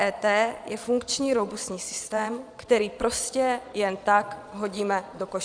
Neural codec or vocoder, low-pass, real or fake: vocoder, 22.05 kHz, 80 mel bands, Vocos; 9.9 kHz; fake